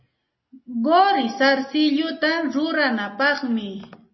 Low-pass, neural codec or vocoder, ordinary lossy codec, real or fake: 7.2 kHz; none; MP3, 24 kbps; real